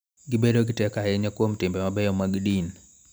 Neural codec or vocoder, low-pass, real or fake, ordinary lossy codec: none; none; real; none